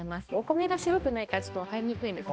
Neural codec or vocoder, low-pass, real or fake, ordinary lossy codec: codec, 16 kHz, 1 kbps, X-Codec, HuBERT features, trained on balanced general audio; none; fake; none